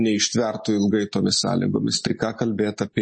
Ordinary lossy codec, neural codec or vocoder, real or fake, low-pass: MP3, 32 kbps; none; real; 9.9 kHz